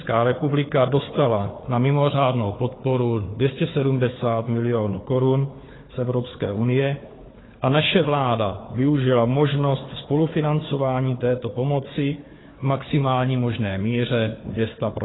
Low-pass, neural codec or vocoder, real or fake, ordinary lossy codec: 7.2 kHz; codec, 16 kHz, 4 kbps, FunCodec, trained on Chinese and English, 50 frames a second; fake; AAC, 16 kbps